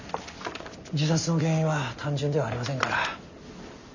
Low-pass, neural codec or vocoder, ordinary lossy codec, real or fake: 7.2 kHz; none; none; real